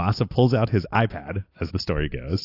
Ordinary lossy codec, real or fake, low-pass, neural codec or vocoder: AAC, 32 kbps; real; 5.4 kHz; none